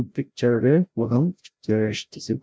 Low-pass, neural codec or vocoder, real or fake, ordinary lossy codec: none; codec, 16 kHz, 0.5 kbps, FreqCodec, larger model; fake; none